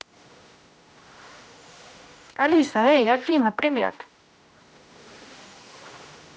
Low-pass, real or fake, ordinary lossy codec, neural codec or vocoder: none; fake; none; codec, 16 kHz, 1 kbps, X-Codec, HuBERT features, trained on general audio